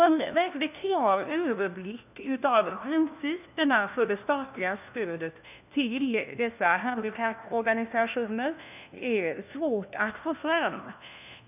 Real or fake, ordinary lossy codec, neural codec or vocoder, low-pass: fake; none; codec, 16 kHz, 1 kbps, FunCodec, trained on LibriTTS, 50 frames a second; 3.6 kHz